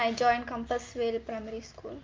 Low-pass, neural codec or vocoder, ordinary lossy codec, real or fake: 7.2 kHz; none; Opus, 24 kbps; real